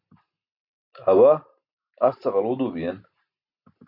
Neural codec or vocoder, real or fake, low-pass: none; real; 5.4 kHz